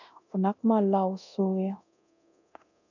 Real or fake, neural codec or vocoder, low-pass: fake; codec, 24 kHz, 0.9 kbps, DualCodec; 7.2 kHz